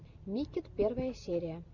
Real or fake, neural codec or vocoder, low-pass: real; none; 7.2 kHz